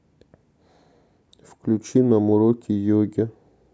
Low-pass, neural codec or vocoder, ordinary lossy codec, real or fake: none; none; none; real